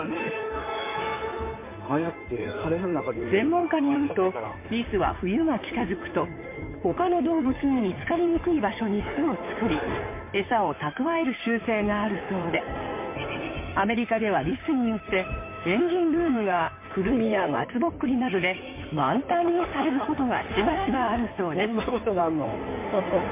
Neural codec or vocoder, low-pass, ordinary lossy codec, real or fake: codec, 16 kHz in and 24 kHz out, 2.2 kbps, FireRedTTS-2 codec; 3.6 kHz; MP3, 24 kbps; fake